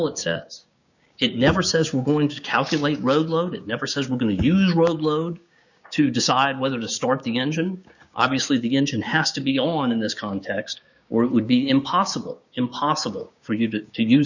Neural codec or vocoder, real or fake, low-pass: codec, 16 kHz, 6 kbps, DAC; fake; 7.2 kHz